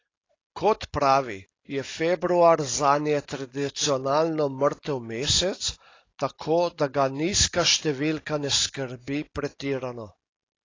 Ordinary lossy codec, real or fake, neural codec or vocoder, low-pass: AAC, 32 kbps; real; none; 7.2 kHz